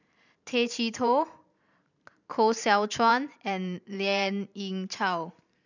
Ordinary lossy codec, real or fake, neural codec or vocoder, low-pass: none; fake; vocoder, 44.1 kHz, 128 mel bands every 512 samples, BigVGAN v2; 7.2 kHz